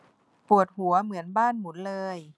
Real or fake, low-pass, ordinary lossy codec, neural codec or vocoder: real; none; none; none